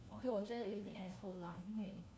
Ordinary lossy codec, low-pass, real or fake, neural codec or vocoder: none; none; fake; codec, 16 kHz, 1 kbps, FunCodec, trained on LibriTTS, 50 frames a second